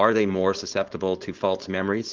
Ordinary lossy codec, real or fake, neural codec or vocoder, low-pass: Opus, 16 kbps; fake; codec, 16 kHz, 4.8 kbps, FACodec; 7.2 kHz